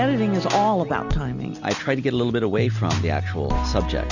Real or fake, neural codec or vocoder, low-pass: real; none; 7.2 kHz